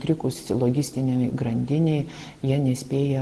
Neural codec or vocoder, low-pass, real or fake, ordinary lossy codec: none; 10.8 kHz; real; Opus, 16 kbps